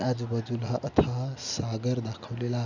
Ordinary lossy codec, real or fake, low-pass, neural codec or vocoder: none; real; 7.2 kHz; none